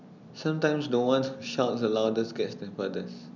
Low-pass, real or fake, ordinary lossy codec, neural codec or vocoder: 7.2 kHz; real; none; none